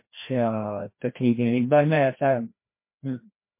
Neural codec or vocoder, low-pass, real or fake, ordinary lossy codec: codec, 16 kHz, 1 kbps, FreqCodec, larger model; 3.6 kHz; fake; MP3, 24 kbps